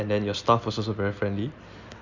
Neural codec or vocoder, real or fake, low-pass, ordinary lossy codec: none; real; 7.2 kHz; none